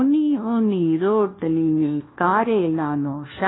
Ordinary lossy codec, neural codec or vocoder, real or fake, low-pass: AAC, 16 kbps; codec, 16 kHz, 1 kbps, FunCodec, trained on LibriTTS, 50 frames a second; fake; 7.2 kHz